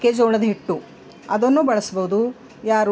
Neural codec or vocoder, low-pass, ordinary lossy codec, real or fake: none; none; none; real